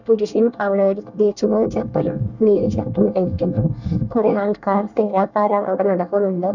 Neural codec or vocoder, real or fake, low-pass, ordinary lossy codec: codec, 24 kHz, 1 kbps, SNAC; fake; 7.2 kHz; none